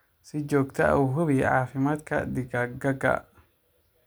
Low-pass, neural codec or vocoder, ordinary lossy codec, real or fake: none; none; none; real